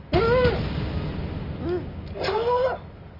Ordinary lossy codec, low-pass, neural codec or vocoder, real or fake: none; 5.4 kHz; none; real